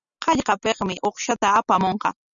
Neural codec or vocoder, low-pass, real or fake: none; 7.2 kHz; real